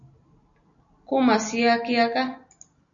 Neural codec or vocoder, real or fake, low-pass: none; real; 7.2 kHz